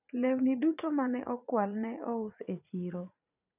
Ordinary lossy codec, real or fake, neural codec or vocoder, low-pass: none; fake; vocoder, 44.1 kHz, 80 mel bands, Vocos; 3.6 kHz